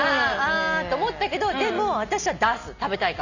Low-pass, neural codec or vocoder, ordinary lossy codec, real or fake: 7.2 kHz; none; none; real